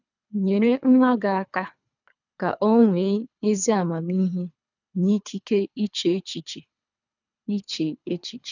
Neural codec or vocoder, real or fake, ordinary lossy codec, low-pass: codec, 24 kHz, 3 kbps, HILCodec; fake; none; 7.2 kHz